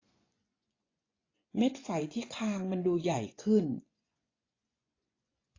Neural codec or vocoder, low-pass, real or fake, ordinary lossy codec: vocoder, 24 kHz, 100 mel bands, Vocos; 7.2 kHz; fake; AAC, 32 kbps